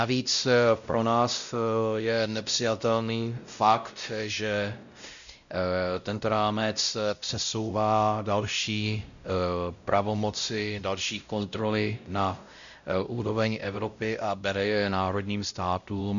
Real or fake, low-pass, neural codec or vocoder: fake; 7.2 kHz; codec, 16 kHz, 0.5 kbps, X-Codec, WavLM features, trained on Multilingual LibriSpeech